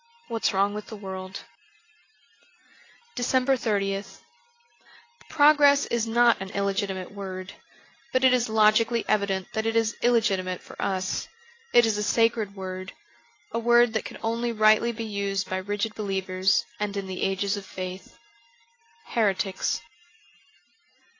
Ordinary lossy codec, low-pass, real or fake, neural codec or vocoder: AAC, 32 kbps; 7.2 kHz; real; none